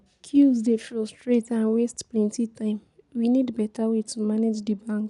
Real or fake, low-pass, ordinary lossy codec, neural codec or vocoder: real; 10.8 kHz; none; none